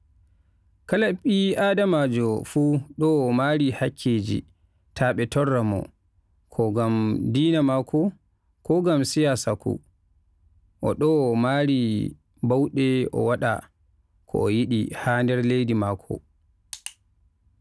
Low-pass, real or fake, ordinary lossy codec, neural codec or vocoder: none; real; none; none